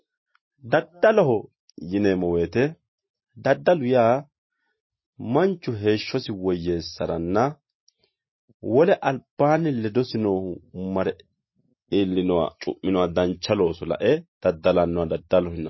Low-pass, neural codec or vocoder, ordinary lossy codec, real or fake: 7.2 kHz; none; MP3, 24 kbps; real